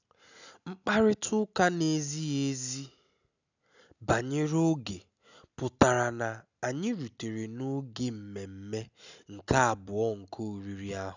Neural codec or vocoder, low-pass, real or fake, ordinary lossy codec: none; 7.2 kHz; real; none